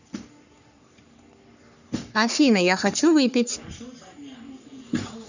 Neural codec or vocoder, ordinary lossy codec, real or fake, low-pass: codec, 44.1 kHz, 3.4 kbps, Pupu-Codec; none; fake; 7.2 kHz